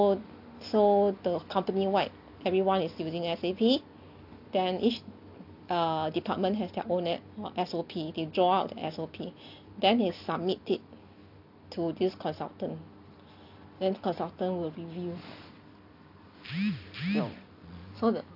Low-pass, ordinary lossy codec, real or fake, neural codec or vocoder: 5.4 kHz; none; real; none